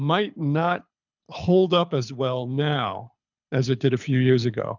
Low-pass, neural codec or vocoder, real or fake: 7.2 kHz; codec, 24 kHz, 6 kbps, HILCodec; fake